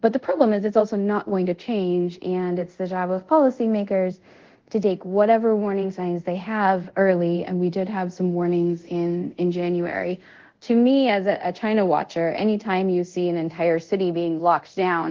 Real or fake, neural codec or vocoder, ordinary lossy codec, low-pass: fake; codec, 24 kHz, 0.5 kbps, DualCodec; Opus, 16 kbps; 7.2 kHz